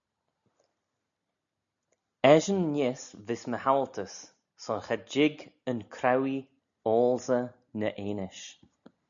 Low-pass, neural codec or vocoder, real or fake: 7.2 kHz; none; real